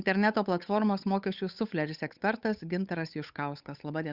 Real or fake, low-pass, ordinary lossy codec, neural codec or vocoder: fake; 5.4 kHz; Opus, 64 kbps; codec, 16 kHz, 8 kbps, FunCodec, trained on Chinese and English, 25 frames a second